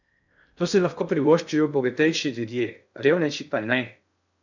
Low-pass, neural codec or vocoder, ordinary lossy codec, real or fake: 7.2 kHz; codec, 16 kHz in and 24 kHz out, 0.6 kbps, FocalCodec, streaming, 2048 codes; none; fake